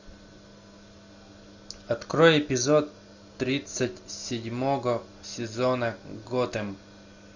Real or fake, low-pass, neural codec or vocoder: real; 7.2 kHz; none